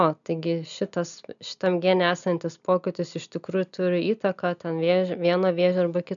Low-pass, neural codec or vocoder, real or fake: 7.2 kHz; none; real